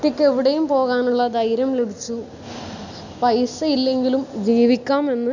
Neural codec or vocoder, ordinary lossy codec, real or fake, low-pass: none; none; real; 7.2 kHz